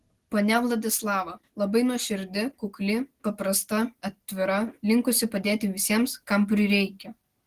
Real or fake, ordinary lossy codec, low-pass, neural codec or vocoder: real; Opus, 16 kbps; 14.4 kHz; none